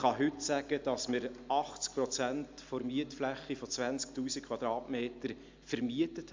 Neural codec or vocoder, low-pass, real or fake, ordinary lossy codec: none; 7.2 kHz; real; MP3, 64 kbps